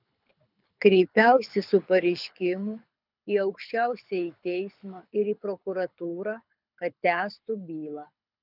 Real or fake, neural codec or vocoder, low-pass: fake; codec, 24 kHz, 6 kbps, HILCodec; 5.4 kHz